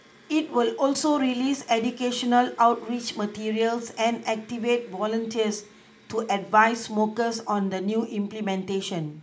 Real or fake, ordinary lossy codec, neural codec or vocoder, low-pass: real; none; none; none